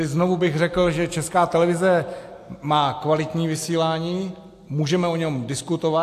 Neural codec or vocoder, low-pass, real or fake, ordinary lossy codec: none; 14.4 kHz; real; AAC, 64 kbps